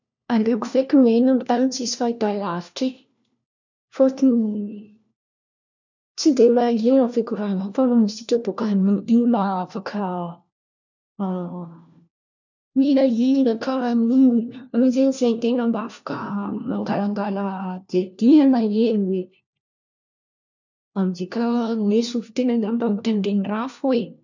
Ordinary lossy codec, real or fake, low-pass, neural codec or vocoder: none; fake; 7.2 kHz; codec, 16 kHz, 1 kbps, FunCodec, trained on LibriTTS, 50 frames a second